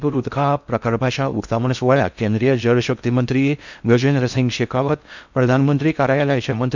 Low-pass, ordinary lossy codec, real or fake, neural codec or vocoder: 7.2 kHz; none; fake; codec, 16 kHz in and 24 kHz out, 0.6 kbps, FocalCodec, streaming, 4096 codes